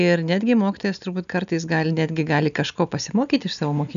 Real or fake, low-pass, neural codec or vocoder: real; 7.2 kHz; none